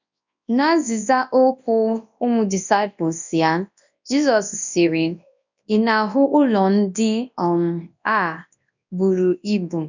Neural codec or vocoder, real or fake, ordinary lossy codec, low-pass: codec, 24 kHz, 0.9 kbps, WavTokenizer, large speech release; fake; none; 7.2 kHz